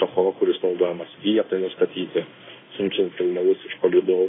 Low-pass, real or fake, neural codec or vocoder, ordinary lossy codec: 7.2 kHz; fake; codec, 16 kHz in and 24 kHz out, 1 kbps, XY-Tokenizer; AAC, 16 kbps